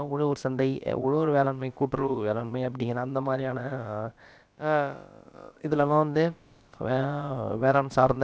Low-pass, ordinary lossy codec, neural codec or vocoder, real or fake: none; none; codec, 16 kHz, about 1 kbps, DyCAST, with the encoder's durations; fake